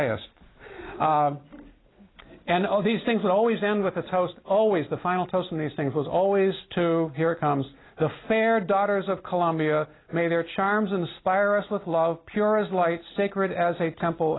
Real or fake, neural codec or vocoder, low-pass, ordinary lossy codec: real; none; 7.2 kHz; AAC, 16 kbps